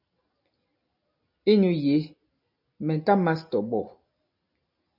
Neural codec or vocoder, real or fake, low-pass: none; real; 5.4 kHz